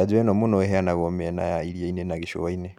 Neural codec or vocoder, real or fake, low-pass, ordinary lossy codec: none; real; 19.8 kHz; none